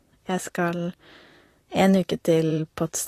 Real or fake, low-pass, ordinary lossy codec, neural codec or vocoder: fake; 14.4 kHz; AAC, 48 kbps; vocoder, 44.1 kHz, 128 mel bands, Pupu-Vocoder